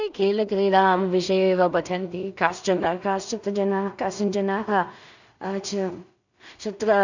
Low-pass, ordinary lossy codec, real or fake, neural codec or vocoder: 7.2 kHz; none; fake; codec, 16 kHz in and 24 kHz out, 0.4 kbps, LongCat-Audio-Codec, two codebook decoder